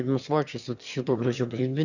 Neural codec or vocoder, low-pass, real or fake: autoencoder, 22.05 kHz, a latent of 192 numbers a frame, VITS, trained on one speaker; 7.2 kHz; fake